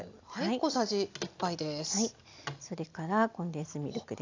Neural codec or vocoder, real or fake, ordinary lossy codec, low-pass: none; real; AAC, 48 kbps; 7.2 kHz